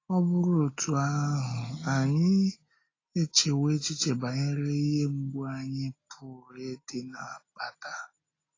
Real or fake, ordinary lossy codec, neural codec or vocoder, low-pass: real; AAC, 32 kbps; none; 7.2 kHz